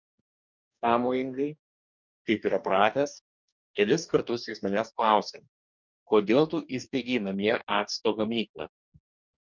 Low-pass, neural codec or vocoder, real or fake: 7.2 kHz; codec, 44.1 kHz, 2.6 kbps, DAC; fake